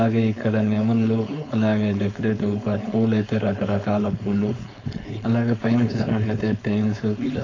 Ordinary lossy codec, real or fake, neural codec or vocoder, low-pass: AAC, 32 kbps; fake; codec, 16 kHz, 4.8 kbps, FACodec; 7.2 kHz